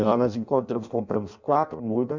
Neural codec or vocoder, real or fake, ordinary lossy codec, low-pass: codec, 16 kHz in and 24 kHz out, 0.6 kbps, FireRedTTS-2 codec; fake; MP3, 64 kbps; 7.2 kHz